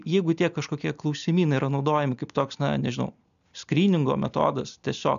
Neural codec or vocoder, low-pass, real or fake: none; 7.2 kHz; real